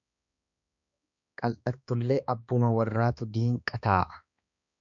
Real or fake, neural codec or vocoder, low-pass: fake; codec, 16 kHz, 2 kbps, X-Codec, HuBERT features, trained on balanced general audio; 7.2 kHz